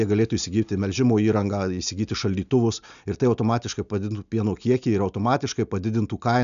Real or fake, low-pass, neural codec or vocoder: real; 7.2 kHz; none